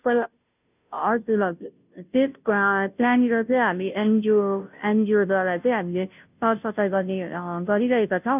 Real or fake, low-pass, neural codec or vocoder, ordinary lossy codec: fake; 3.6 kHz; codec, 16 kHz, 0.5 kbps, FunCodec, trained on Chinese and English, 25 frames a second; none